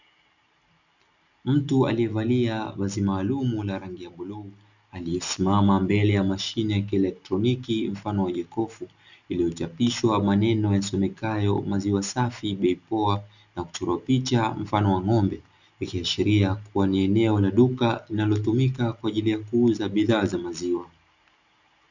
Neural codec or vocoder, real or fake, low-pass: none; real; 7.2 kHz